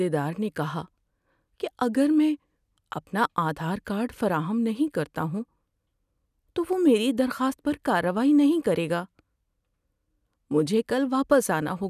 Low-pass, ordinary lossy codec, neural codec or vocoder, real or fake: 14.4 kHz; none; none; real